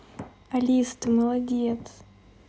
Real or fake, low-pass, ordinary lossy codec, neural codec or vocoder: real; none; none; none